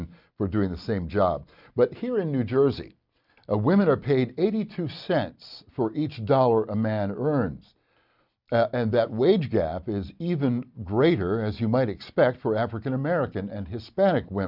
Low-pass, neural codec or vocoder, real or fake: 5.4 kHz; none; real